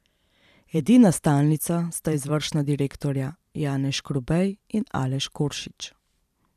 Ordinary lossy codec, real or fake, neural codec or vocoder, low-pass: none; fake; vocoder, 44.1 kHz, 128 mel bands every 512 samples, BigVGAN v2; 14.4 kHz